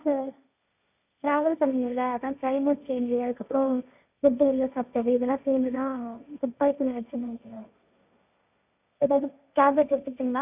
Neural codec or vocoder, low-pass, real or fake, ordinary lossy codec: codec, 16 kHz, 1.1 kbps, Voila-Tokenizer; 3.6 kHz; fake; none